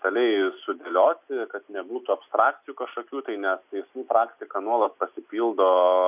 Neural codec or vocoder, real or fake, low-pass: none; real; 3.6 kHz